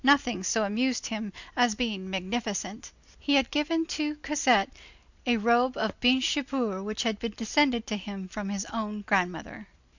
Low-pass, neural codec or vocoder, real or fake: 7.2 kHz; none; real